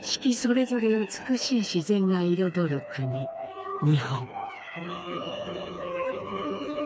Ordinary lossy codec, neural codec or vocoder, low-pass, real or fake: none; codec, 16 kHz, 2 kbps, FreqCodec, smaller model; none; fake